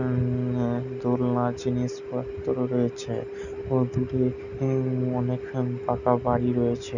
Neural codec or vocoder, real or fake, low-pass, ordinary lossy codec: none; real; 7.2 kHz; none